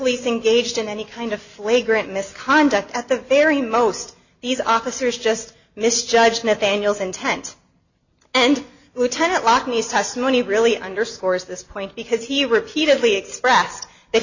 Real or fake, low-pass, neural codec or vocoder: real; 7.2 kHz; none